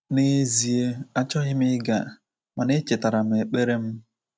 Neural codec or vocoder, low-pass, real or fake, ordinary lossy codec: none; none; real; none